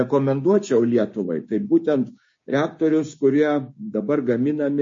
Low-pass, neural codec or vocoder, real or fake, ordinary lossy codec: 7.2 kHz; codec, 16 kHz, 6 kbps, DAC; fake; MP3, 32 kbps